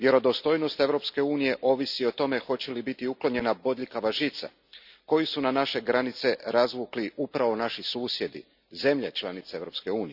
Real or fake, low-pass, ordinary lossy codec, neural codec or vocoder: real; 5.4 kHz; none; none